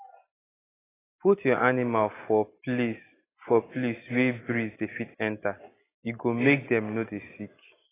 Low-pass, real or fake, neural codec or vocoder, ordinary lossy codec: 3.6 kHz; real; none; AAC, 16 kbps